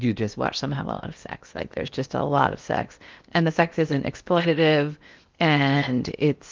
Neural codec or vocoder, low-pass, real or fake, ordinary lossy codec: codec, 16 kHz in and 24 kHz out, 0.8 kbps, FocalCodec, streaming, 65536 codes; 7.2 kHz; fake; Opus, 24 kbps